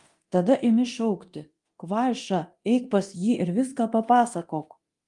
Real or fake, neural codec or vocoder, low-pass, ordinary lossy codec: fake; codec, 24 kHz, 0.9 kbps, DualCodec; 10.8 kHz; Opus, 24 kbps